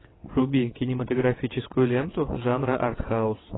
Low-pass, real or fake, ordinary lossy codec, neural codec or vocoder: 7.2 kHz; fake; AAC, 16 kbps; vocoder, 22.05 kHz, 80 mel bands, WaveNeXt